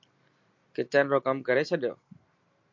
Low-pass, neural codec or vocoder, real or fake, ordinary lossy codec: 7.2 kHz; none; real; MP3, 48 kbps